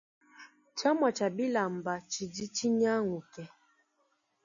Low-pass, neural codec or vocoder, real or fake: 7.2 kHz; none; real